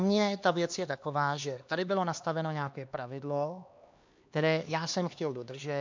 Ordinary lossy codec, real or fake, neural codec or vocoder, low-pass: MP3, 64 kbps; fake; codec, 16 kHz, 2 kbps, X-Codec, HuBERT features, trained on LibriSpeech; 7.2 kHz